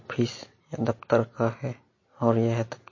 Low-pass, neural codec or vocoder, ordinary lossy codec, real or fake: 7.2 kHz; none; MP3, 32 kbps; real